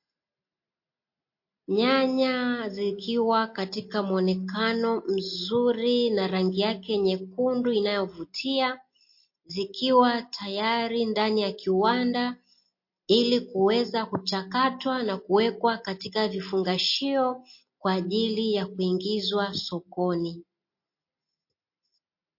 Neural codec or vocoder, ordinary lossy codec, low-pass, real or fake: none; MP3, 32 kbps; 5.4 kHz; real